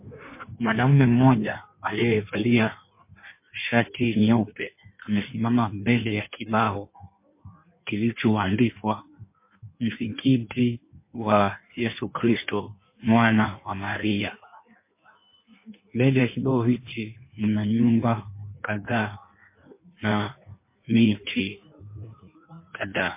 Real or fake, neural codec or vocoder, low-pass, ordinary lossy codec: fake; codec, 16 kHz in and 24 kHz out, 1.1 kbps, FireRedTTS-2 codec; 3.6 kHz; MP3, 24 kbps